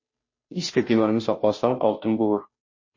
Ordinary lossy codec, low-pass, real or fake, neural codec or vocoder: MP3, 32 kbps; 7.2 kHz; fake; codec, 16 kHz, 0.5 kbps, FunCodec, trained on Chinese and English, 25 frames a second